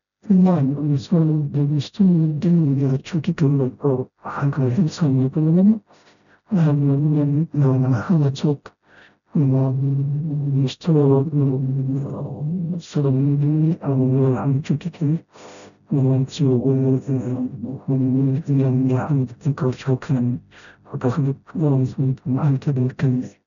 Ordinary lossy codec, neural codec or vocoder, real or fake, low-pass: none; codec, 16 kHz, 0.5 kbps, FreqCodec, smaller model; fake; 7.2 kHz